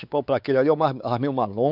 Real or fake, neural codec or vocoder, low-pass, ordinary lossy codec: fake; codec, 16 kHz, 4 kbps, X-Codec, WavLM features, trained on Multilingual LibriSpeech; 5.4 kHz; none